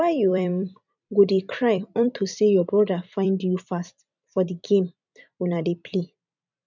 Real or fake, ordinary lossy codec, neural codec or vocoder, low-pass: fake; none; vocoder, 44.1 kHz, 128 mel bands every 256 samples, BigVGAN v2; 7.2 kHz